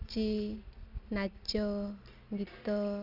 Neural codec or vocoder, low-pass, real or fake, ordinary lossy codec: none; 5.4 kHz; real; none